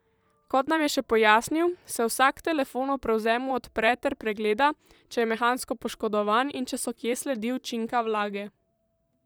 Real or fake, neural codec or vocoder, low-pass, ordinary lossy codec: fake; vocoder, 44.1 kHz, 128 mel bands, Pupu-Vocoder; none; none